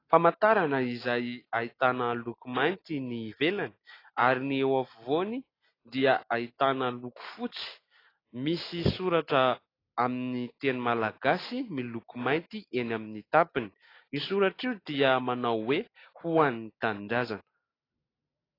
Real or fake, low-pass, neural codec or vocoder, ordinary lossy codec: real; 5.4 kHz; none; AAC, 24 kbps